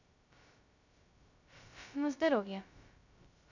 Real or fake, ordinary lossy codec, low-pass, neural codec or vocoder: fake; none; 7.2 kHz; codec, 16 kHz, 0.2 kbps, FocalCodec